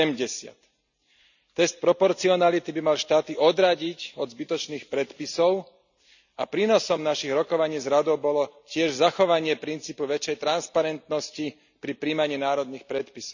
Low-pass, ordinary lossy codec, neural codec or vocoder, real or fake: 7.2 kHz; none; none; real